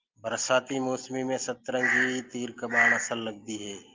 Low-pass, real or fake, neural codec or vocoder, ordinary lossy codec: 7.2 kHz; real; none; Opus, 32 kbps